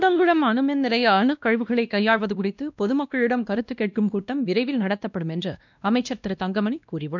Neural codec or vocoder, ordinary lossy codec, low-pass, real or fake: codec, 16 kHz, 1 kbps, X-Codec, WavLM features, trained on Multilingual LibriSpeech; none; 7.2 kHz; fake